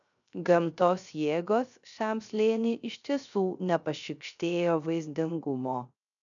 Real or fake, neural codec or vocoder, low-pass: fake; codec, 16 kHz, 0.3 kbps, FocalCodec; 7.2 kHz